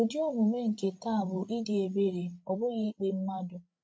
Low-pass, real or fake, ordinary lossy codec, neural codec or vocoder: none; fake; none; codec, 16 kHz, 16 kbps, FreqCodec, larger model